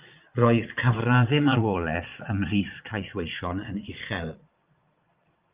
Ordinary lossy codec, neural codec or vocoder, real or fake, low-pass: Opus, 24 kbps; vocoder, 22.05 kHz, 80 mel bands, Vocos; fake; 3.6 kHz